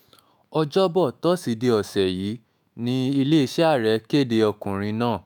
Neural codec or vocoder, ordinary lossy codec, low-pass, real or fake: autoencoder, 48 kHz, 128 numbers a frame, DAC-VAE, trained on Japanese speech; none; none; fake